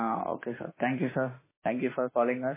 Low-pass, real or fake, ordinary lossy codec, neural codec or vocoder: 3.6 kHz; fake; MP3, 16 kbps; autoencoder, 48 kHz, 32 numbers a frame, DAC-VAE, trained on Japanese speech